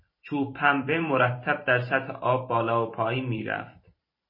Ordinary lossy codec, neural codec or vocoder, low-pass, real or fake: MP3, 24 kbps; vocoder, 44.1 kHz, 128 mel bands every 512 samples, BigVGAN v2; 5.4 kHz; fake